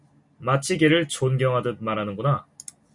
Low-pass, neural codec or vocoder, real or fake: 10.8 kHz; none; real